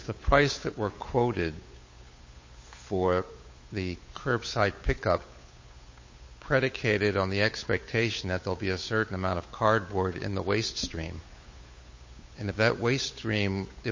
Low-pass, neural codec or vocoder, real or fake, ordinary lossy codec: 7.2 kHz; codec, 16 kHz, 8 kbps, FunCodec, trained on Chinese and English, 25 frames a second; fake; MP3, 32 kbps